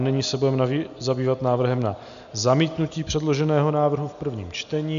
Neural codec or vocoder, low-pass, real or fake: none; 7.2 kHz; real